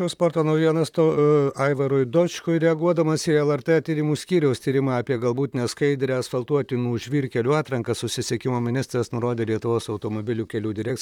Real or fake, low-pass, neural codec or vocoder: fake; 19.8 kHz; vocoder, 44.1 kHz, 128 mel bands, Pupu-Vocoder